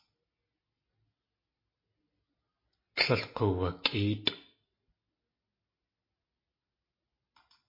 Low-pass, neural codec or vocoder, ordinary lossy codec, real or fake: 5.4 kHz; none; MP3, 24 kbps; real